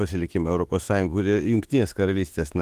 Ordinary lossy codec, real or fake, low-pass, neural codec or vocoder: Opus, 24 kbps; fake; 14.4 kHz; autoencoder, 48 kHz, 32 numbers a frame, DAC-VAE, trained on Japanese speech